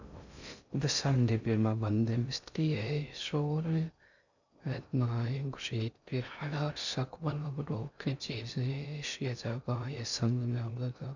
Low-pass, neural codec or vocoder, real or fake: 7.2 kHz; codec, 16 kHz in and 24 kHz out, 0.6 kbps, FocalCodec, streaming, 2048 codes; fake